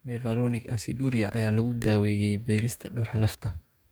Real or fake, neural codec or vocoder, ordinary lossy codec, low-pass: fake; codec, 44.1 kHz, 2.6 kbps, DAC; none; none